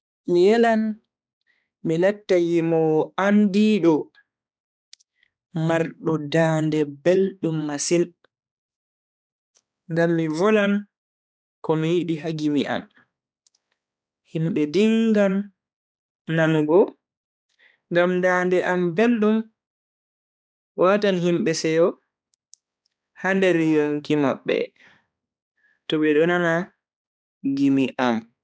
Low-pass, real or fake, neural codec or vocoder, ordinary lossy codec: none; fake; codec, 16 kHz, 2 kbps, X-Codec, HuBERT features, trained on balanced general audio; none